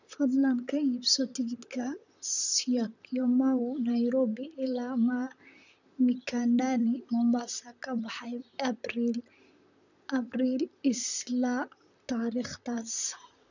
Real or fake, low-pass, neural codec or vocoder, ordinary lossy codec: fake; 7.2 kHz; vocoder, 44.1 kHz, 128 mel bands, Pupu-Vocoder; none